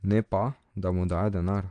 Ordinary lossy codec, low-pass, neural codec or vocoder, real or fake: Opus, 24 kbps; 9.9 kHz; none; real